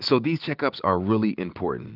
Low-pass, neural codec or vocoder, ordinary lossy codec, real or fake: 5.4 kHz; none; Opus, 24 kbps; real